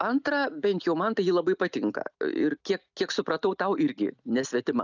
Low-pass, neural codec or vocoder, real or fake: 7.2 kHz; none; real